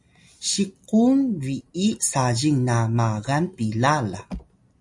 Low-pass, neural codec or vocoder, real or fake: 10.8 kHz; none; real